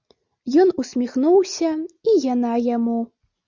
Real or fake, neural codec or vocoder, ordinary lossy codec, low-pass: real; none; Opus, 64 kbps; 7.2 kHz